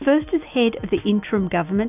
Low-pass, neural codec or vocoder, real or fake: 3.6 kHz; none; real